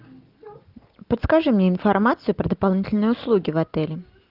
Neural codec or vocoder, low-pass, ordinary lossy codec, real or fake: none; 5.4 kHz; Opus, 24 kbps; real